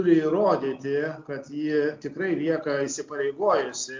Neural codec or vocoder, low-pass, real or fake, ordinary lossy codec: none; 7.2 kHz; real; MP3, 48 kbps